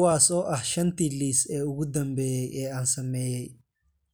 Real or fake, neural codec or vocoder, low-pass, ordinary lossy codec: real; none; none; none